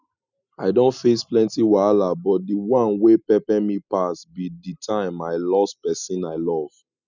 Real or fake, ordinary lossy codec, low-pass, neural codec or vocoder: real; none; 7.2 kHz; none